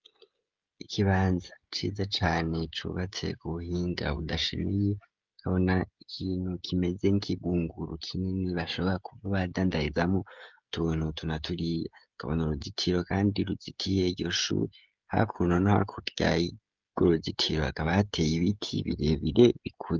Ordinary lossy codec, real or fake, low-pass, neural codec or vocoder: Opus, 24 kbps; fake; 7.2 kHz; codec, 16 kHz, 16 kbps, FreqCodec, smaller model